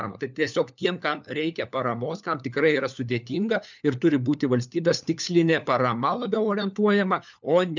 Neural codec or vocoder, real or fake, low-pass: codec, 16 kHz, 4 kbps, FunCodec, trained on LibriTTS, 50 frames a second; fake; 7.2 kHz